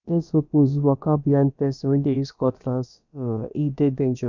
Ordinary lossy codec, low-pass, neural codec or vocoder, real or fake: none; 7.2 kHz; codec, 16 kHz, about 1 kbps, DyCAST, with the encoder's durations; fake